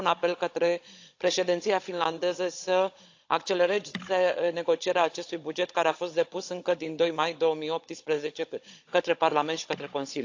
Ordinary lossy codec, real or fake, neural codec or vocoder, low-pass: AAC, 48 kbps; fake; codec, 16 kHz, 16 kbps, FunCodec, trained on Chinese and English, 50 frames a second; 7.2 kHz